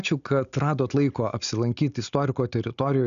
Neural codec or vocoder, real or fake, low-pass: none; real; 7.2 kHz